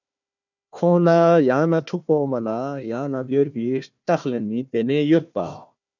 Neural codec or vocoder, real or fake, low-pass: codec, 16 kHz, 1 kbps, FunCodec, trained on Chinese and English, 50 frames a second; fake; 7.2 kHz